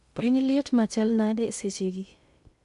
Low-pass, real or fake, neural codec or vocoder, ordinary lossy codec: 10.8 kHz; fake; codec, 16 kHz in and 24 kHz out, 0.6 kbps, FocalCodec, streaming, 2048 codes; none